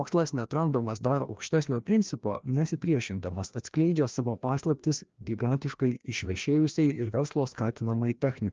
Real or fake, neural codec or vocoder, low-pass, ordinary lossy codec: fake; codec, 16 kHz, 1 kbps, FreqCodec, larger model; 7.2 kHz; Opus, 24 kbps